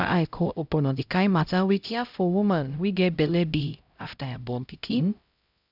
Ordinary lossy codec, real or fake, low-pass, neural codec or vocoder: none; fake; 5.4 kHz; codec, 16 kHz, 0.5 kbps, X-Codec, HuBERT features, trained on LibriSpeech